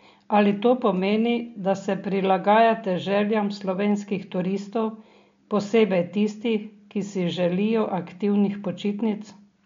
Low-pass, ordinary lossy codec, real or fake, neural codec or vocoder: 7.2 kHz; MP3, 48 kbps; real; none